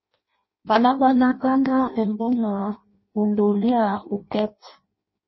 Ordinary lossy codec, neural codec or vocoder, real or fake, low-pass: MP3, 24 kbps; codec, 16 kHz in and 24 kHz out, 0.6 kbps, FireRedTTS-2 codec; fake; 7.2 kHz